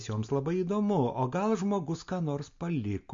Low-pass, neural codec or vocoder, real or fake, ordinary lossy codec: 7.2 kHz; none; real; MP3, 48 kbps